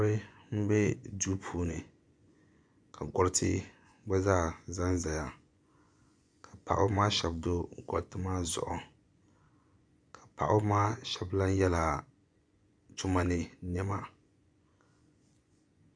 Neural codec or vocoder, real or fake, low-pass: vocoder, 24 kHz, 100 mel bands, Vocos; fake; 9.9 kHz